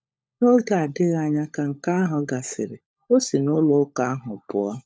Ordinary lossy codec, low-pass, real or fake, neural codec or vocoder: none; none; fake; codec, 16 kHz, 16 kbps, FunCodec, trained on LibriTTS, 50 frames a second